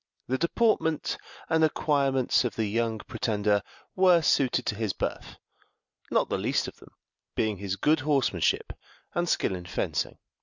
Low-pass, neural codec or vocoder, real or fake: 7.2 kHz; none; real